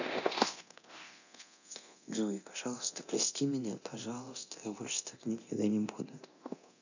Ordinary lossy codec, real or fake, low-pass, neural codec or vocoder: none; fake; 7.2 kHz; codec, 24 kHz, 0.9 kbps, DualCodec